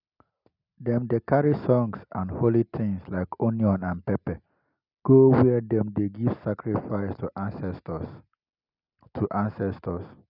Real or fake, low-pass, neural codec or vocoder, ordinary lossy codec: real; 5.4 kHz; none; none